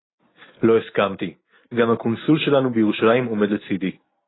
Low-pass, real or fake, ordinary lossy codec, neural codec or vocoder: 7.2 kHz; real; AAC, 16 kbps; none